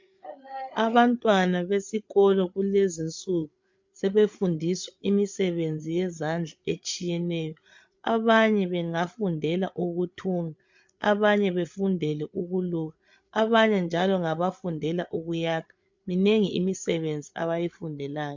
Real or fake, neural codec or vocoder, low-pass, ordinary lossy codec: fake; codec, 16 kHz, 8 kbps, FreqCodec, larger model; 7.2 kHz; MP3, 64 kbps